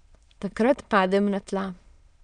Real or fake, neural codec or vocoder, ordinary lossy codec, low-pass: fake; autoencoder, 22.05 kHz, a latent of 192 numbers a frame, VITS, trained on many speakers; none; 9.9 kHz